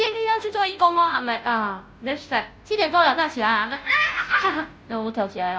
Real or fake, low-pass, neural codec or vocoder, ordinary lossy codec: fake; none; codec, 16 kHz, 0.5 kbps, FunCodec, trained on Chinese and English, 25 frames a second; none